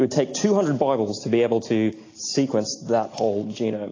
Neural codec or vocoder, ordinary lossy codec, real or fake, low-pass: none; AAC, 32 kbps; real; 7.2 kHz